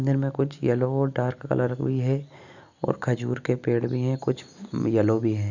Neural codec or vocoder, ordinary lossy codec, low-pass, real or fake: none; none; 7.2 kHz; real